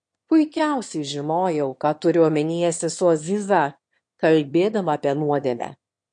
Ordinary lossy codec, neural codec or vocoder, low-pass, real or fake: MP3, 48 kbps; autoencoder, 22.05 kHz, a latent of 192 numbers a frame, VITS, trained on one speaker; 9.9 kHz; fake